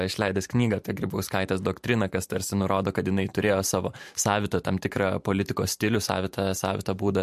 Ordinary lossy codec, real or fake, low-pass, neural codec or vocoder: MP3, 64 kbps; fake; 14.4 kHz; vocoder, 44.1 kHz, 128 mel bands every 512 samples, BigVGAN v2